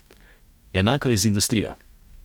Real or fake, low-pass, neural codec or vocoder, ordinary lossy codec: fake; 19.8 kHz; codec, 44.1 kHz, 2.6 kbps, DAC; none